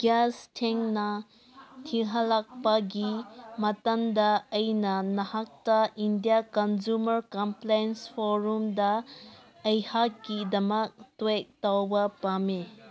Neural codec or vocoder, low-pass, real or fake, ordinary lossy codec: none; none; real; none